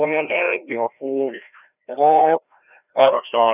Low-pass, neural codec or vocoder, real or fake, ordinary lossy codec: 3.6 kHz; codec, 16 kHz, 1 kbps, FreqCodec, larger model; fake; none